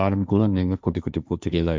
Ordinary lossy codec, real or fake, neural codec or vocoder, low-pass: none; fake; codec, 16 kHz, 1.1 kbps, Voila-Tokenizer; none